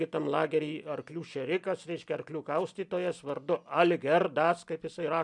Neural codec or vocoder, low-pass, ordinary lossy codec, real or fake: none; 10.8 kHz; MP3, 96 kbps; real